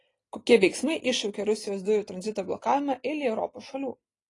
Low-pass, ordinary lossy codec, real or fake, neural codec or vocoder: 10.8 kHz; AAC, 32 kbps; real; none